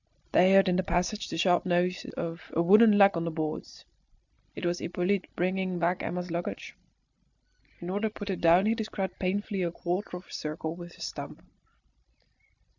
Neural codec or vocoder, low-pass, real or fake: none; 7.2 kHz; real